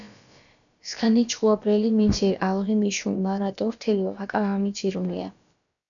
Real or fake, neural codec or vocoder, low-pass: fake; codec, 16 kHz, about 1 kbps, DyCAST, with the encoder's durations; 7.2 kHz